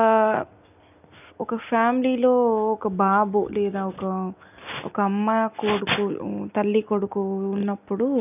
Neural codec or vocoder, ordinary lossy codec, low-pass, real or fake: none; none; 3.6 kHz; real